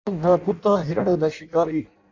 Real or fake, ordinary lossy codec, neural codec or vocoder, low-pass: fake; AAC, 48 kbps; codec, 16 kHz in and 24 kHz out, 0.6 kbps, FireRedTTS-2 codec; 7.2 kHz